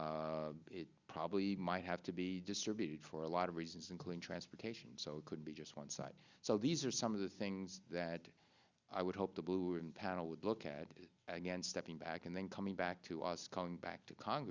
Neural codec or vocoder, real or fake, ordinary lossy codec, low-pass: none; real; Opus, 64 kbps; 7.2 kHz